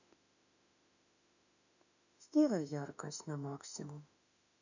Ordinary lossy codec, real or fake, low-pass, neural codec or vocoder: none; fake; 7.2 kHz; autoencoder, 48 kHz, 32 numbers a frame, DAC-VAE, trained on Japanese speech